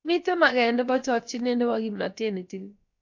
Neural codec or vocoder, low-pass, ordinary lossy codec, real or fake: codec, 16 kHz, about 1 kbps, DyCAST, with the encoder's durations; 7.2 kHz; AAC, 48 kbps; fake